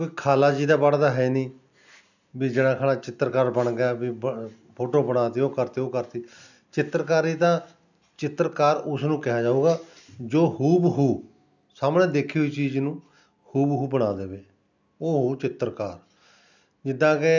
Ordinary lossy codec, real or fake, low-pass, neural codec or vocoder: none; real; 7.2 kHz; none